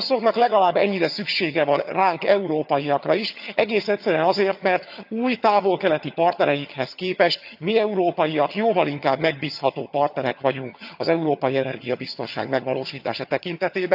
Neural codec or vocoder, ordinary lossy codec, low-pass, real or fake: vocoder, 22.05 kHz, 80 mel bands, HiFi-GAN; AAC, 48 kbps; 5.4 kHz; fake